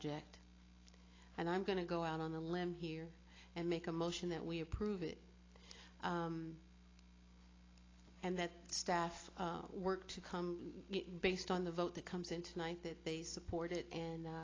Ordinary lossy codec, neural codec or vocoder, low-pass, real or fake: AAC, 32 kbps; none; 7.2 kHz; real